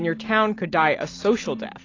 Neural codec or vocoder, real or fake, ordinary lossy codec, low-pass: none; real; AAC, 32 kbps; 7.2 kHz